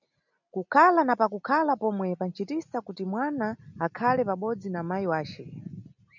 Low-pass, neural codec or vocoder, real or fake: 7.2 kHz; none; real